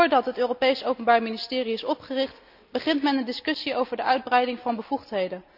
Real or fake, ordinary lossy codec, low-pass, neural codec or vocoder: real; none; 5.4 kHz; none